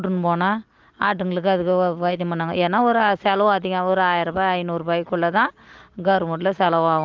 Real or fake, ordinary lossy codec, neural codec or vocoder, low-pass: real; Opus, 32 kbps; none; 7.2 kHz